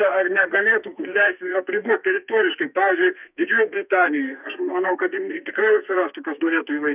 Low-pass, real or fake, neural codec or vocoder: 3.6 kHz; fake; codec, 32 kHz, 1.9 kbps, SNAC